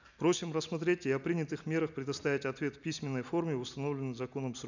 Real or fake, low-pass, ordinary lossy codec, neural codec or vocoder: real; 7.2 kHz; none; none